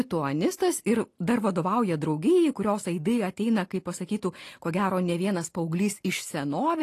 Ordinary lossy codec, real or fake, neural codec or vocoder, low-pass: AAC, 48 kbps; real; none; 14.4 kHz